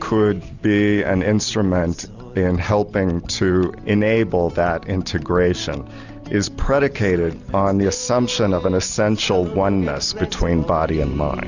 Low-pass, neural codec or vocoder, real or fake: 7.2 kHz; none; real